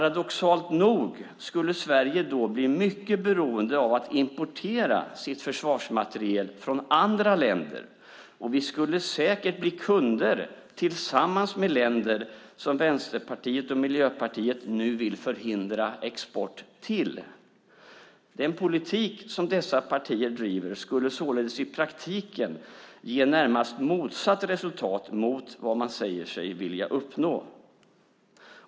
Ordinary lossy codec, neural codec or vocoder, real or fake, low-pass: none; none; real; none